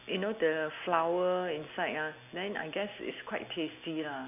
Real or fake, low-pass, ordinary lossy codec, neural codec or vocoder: real; 3.6 kHz; none; none